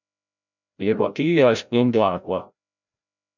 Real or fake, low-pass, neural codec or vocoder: fake; 7.2 kHz; codec, 16 kHz, 0.5 kbps, FreqCodec, larger model